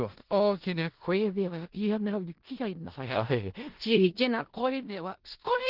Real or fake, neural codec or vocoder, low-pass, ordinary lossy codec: fake; codec, 16 kHz in and 24 kHz out, 0.4 kbps, LongCat-Audio-Codec, four codebook decoder; 5.4 kHz; Opus, 16 kbps